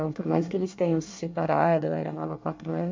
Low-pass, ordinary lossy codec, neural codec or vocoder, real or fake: 7.2 kHz; MP3, 48 kbps; codec, 24 kHz, 1 kbps, SNAC; fake